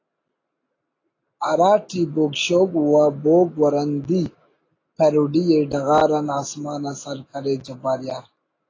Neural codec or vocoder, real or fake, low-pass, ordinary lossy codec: none; real; 7.2 kHz; AAC, 32 kbps